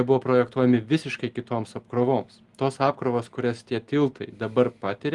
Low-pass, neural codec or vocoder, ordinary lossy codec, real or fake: 10.8 kHz; none; Opus, 24 kbps; real